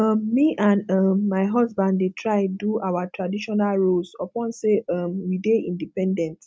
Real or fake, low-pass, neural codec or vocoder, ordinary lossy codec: real; none; none; none